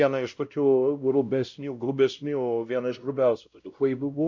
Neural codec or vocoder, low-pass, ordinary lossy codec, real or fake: codec, 16 kHz, 0.5 kbps, X-Codec, WavLM features, trained on Multilingual LibriSpeech; 7.2 kHz; MP3, 64 kbps; fake